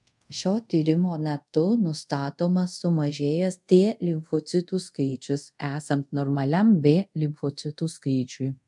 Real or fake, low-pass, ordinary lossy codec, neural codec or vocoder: fake; 10.8 kHz; MP3, 96 kbps; codec, 24 kHz, 0.5 kbps, DualCodec